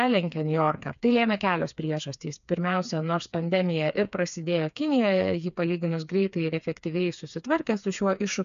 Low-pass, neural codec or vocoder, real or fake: 7.2 kHz; codec, 16 kHz, 4 kbps, FreqCodec, smaller model; fake